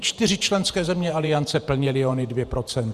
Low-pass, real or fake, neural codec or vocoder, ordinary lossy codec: 14.4 kHz; fake; vocoder, 48 kHz, 128 mel bands, Vocos; Opus, 64 kbps